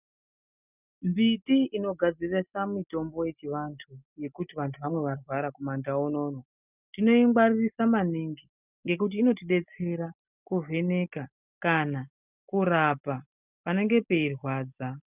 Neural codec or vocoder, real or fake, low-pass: none; real; 3.6 kHz